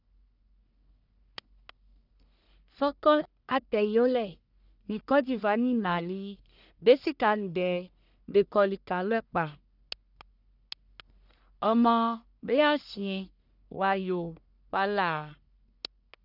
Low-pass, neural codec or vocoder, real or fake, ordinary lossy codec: 5.4 kHz; codec, 44.1 kHz, 1.7 kbps, Pupu-Codec; fake; none